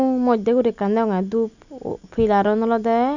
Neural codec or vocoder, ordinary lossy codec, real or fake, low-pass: none; none; real; 7.2 kHz